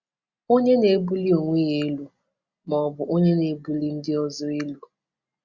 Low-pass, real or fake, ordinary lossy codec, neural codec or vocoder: 7.2 kHz; real; Opus, 64 kbps; none